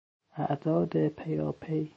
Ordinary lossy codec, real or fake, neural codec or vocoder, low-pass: MP3, 32 kbps; fake; autoencoder, 48 kHz, 128 numbers a frame, DAC-VAE, trained on Japanese speech; 9.9 kHz